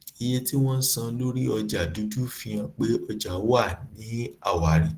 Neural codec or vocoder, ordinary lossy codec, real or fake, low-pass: none; Opus, 16 kbps; real; 14.4 kHz